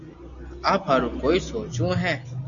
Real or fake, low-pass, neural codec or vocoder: real; 7.2 kHz; none